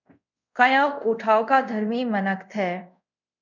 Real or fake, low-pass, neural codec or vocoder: fake; 7.2 kHz; codec, 24 kHz, 0.5 kbps, DualCodec